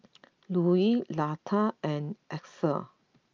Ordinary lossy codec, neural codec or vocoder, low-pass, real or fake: Opus, 24 kbps; none; 7.2 kHz; real